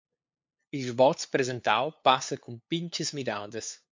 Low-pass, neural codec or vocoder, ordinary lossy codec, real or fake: 7.2 kHz; codec, 16 kHz, 2 kbps, FunCodec, trained on LibriTTS, 25 frames a second; MP3, 48 kbps; fake